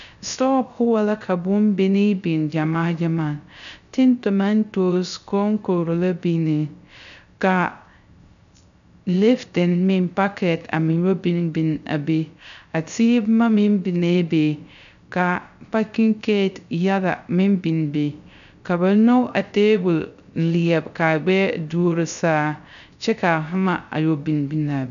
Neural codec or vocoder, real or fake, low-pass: codec, 16 kHz, 0.3 kbps, FocalCodec; fake; 7.2 kHz